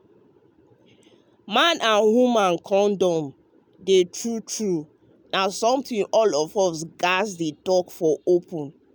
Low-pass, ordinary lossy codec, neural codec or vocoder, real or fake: none; none; none; real